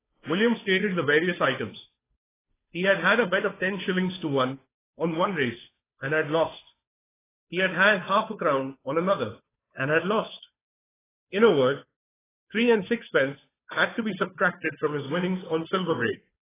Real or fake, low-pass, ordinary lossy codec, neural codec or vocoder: fake; 3.6 kHz; AAC, 16 kbps; codec, 16 kHz, 2 kbps, FunCodec, trained on Chinese and English, 25 frames a second